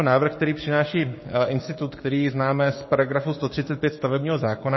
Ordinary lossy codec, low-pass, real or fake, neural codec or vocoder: MP3, 24 kbps; 7.2 kHz; fake; codec, 16 kHz, 6 kbps, DAC